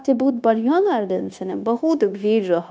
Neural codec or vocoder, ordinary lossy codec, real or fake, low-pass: codec, 16 kHz, 0.9 kbps, LongCat-Audio-Codec; none; fake; none